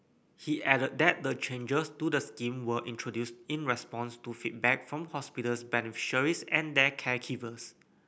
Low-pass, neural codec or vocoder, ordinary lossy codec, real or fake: none; none; none; real